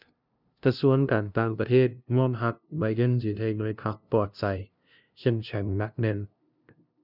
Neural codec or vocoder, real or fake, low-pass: codec, 16 kHz, 0.5 kbps, FunCodec, trained on LibriTTS, 25 frames a second; fake; 5.4 kHz